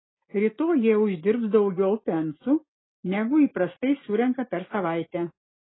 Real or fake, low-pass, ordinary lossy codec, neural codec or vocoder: real; 7.2 kHz; AAC, 16 kbps; none